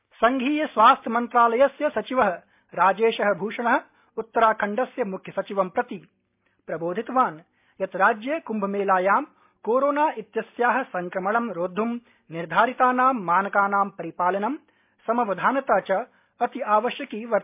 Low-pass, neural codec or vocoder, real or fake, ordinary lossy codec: 3.6 kHz; none; real; MP3, 32 kbps